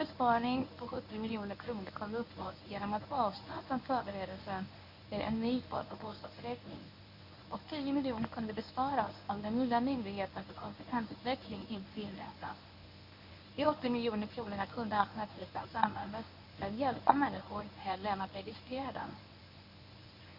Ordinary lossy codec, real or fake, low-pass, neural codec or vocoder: none; fake; 5.4 kHz; codec, 24 kHz, 0.9 kbps, WavTokenizer, medium speech release version 1